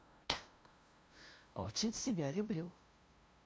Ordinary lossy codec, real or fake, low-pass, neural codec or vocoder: none; fake; none; codec, 16 kHz, 0.5 kbps, FunCodec, trained on LibriTTS, 25 frames a second